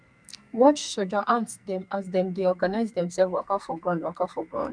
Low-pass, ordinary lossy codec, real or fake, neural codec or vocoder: 9.9 kHz; none; fake; codec, 44.1 kHz, 2.6 kbps, SNAC